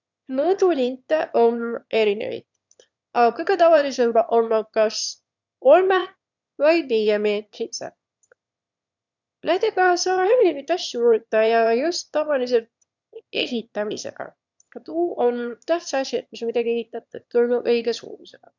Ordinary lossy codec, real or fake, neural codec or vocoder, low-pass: none; fake; autoencoder, 22.05 kHz, a latent of 192 numbers a frame, VITS, trained on one speaker; 7.2 kHz